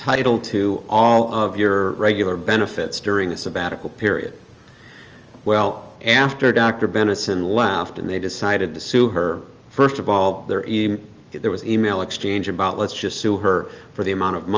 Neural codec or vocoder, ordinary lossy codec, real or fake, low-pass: none; Opus, 24 kbps; real; 7.2 kHz